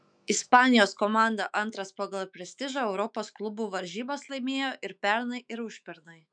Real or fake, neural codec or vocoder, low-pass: fake; autoencoder, 48 kHz, 128 numbers a frame, DAC-VAE, trained on Japanese speech; 9.9 kHz